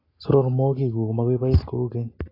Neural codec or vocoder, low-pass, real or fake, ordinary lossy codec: none; 5.4 kHz; real; AAC, 24 kbps